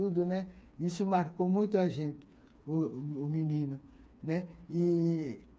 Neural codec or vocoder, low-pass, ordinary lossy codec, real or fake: codec, 16 kHz, 4 kbps, FreqCodec, smaller model; none; none; fake